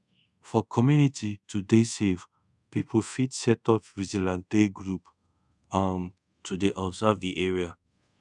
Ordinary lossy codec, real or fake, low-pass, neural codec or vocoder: none; fake; 10.8 kHz; codec, 24 kHz, 0.5 kbps, DualCodec